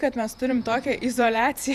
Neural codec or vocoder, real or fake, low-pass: vocoder, 48 kHz, 128 mel bands, Vocos; fake; 14.4 kHz